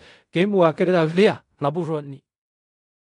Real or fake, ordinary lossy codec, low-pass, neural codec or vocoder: fake; none; 10.8 kHz; codec, 16 kHz in and 24 kHz out, 0.4 kbps, LongCat-Audio-Codec, fine tuned four codebook decoder